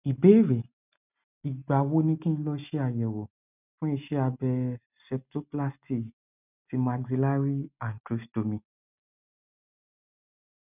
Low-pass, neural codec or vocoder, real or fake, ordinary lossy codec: 3.6 kHz; none; real; none